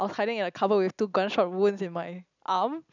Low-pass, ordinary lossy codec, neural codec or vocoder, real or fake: 7.2 kHz; none; none; real